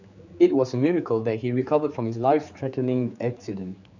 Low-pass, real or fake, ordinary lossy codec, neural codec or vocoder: 7.2 kHz; fake; none; codec, 16 kHz, 4 kbps, X-Codec, HuBERT features, trained on general audio